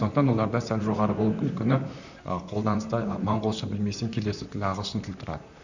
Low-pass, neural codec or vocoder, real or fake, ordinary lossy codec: 7.2 kHz; vocoder, 44.1 kHz, 128 mel bands, Pupu-Vocoder; fake; none